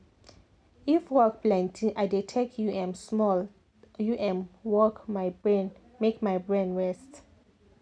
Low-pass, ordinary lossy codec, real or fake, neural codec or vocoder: 9.9 kHz; none; real; none